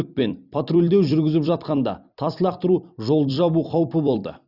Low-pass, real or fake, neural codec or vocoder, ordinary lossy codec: 5.4 kHz; fake; vocoder, 44.1 kHz, 128 mel bands every 256 samples, BigVGAN v2; Opus, 64 kbps